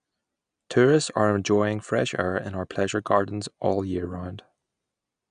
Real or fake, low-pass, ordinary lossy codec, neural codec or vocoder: real; 9.9 kHz; none; none